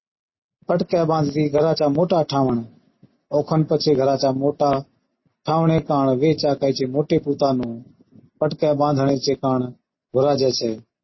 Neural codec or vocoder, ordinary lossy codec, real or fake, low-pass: none; MP3, 24 kbps; real; 7.2 kHz